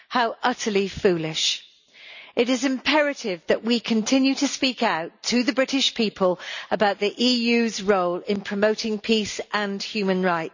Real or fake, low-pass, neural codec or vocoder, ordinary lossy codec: real; 7.2 kHz; none; none